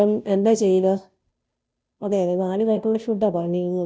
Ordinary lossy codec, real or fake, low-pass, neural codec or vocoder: none; fake; none; codec, 16 kHz, 0.5 kbps, FunCodec, trained on Chinese and English, 25 frames a second